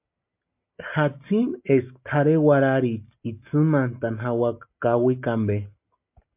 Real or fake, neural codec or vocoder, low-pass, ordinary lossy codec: real; none; 3.6 kHz; MP3, 32 kbps